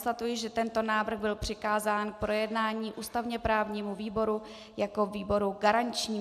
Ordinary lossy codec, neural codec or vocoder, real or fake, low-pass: AAC, 96 kbps; none; real; 14.4 kHz